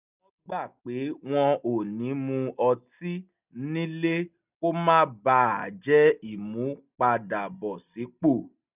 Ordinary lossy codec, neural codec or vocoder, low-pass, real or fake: none; none; 3.6 kHz; real